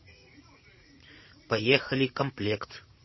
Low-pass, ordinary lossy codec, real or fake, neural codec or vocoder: 7.2 kHz; MP3, 24 kbps; fake; vocoder, 44.1 kHz, 80 mel bands, Vocos